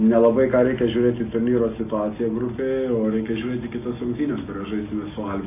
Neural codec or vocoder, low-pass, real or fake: none; 3.6 kHz; real